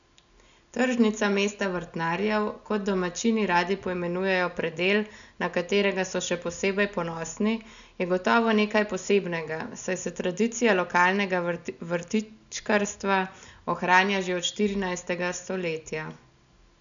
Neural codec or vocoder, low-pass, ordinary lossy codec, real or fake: none; 7.2 kHz; none; real